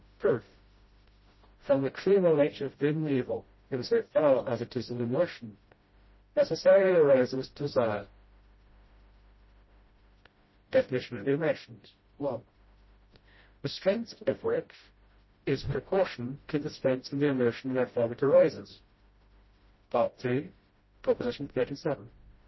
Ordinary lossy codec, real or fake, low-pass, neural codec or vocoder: MP3, 24 kbps; fake; 7.2 kHz; codec, 16 kHz, 0.5 kbps, FreqCodec, smaller model